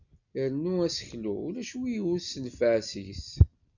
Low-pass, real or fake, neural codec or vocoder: 7.2 kHz; real; none